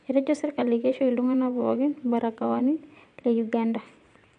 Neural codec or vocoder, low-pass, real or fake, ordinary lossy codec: vocoder, 22.05 kHz, 80 mel bands, Vocos; 9.9 kHz; fake; MP3, 64 kbps